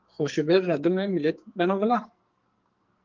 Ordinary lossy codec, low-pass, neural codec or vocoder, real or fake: Opus, 32 kbps; 7.2 kHz; codec, 44.1 kHz, 2.6 kbps, SNAC; fake